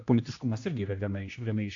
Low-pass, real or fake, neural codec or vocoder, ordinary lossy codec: 7.2 kHz; fake; codec, 16 kHz, 1 kbps, X-Codec, HuBERT features, trained on general audio; AAC, 48 kbps